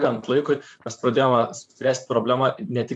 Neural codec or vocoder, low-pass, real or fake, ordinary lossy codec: vocoder, 44.1 kHz, 128 mel bands, Pupu-Vocoder; 10.8 kHz; fake; AAC, 64 kbps